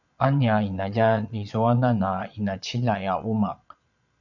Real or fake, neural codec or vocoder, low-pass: fake; vocoder, 22.05 kHz, 80 mel bands, Vocos; 7.2 kHz